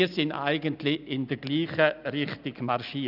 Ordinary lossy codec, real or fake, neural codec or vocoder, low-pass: none; real; none; 5.4 kHz